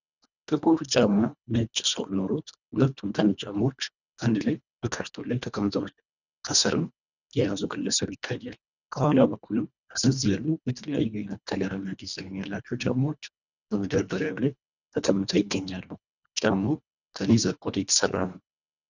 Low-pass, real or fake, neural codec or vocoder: 7.2 kHz; fake; codec, 24 kHz, 1.5 kbps, HILCodec